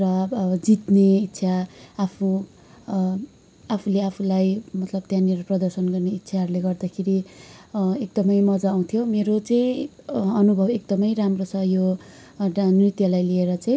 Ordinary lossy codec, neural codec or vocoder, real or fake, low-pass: none; none; real; none